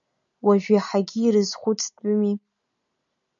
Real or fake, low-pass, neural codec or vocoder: real; 7.2 kHz; none